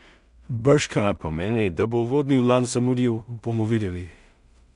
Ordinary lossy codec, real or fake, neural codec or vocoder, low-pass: none; fake; codec, 16 kHz in and 24 kHz out, 0.4 kbps, LongCat-Audio-Codec, two codebook decoder; 10.8 kHz